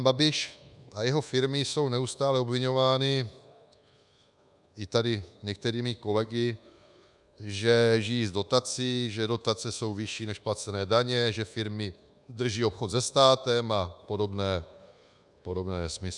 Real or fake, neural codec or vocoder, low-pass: fake; codec, 24 kHz, 1.2 kbps, DualCodec; 10.8 kHz